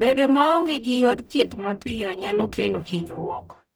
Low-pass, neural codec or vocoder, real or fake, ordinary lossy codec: none; codec, 44.1 kHz, 0.9 kbps, DAC; fake; none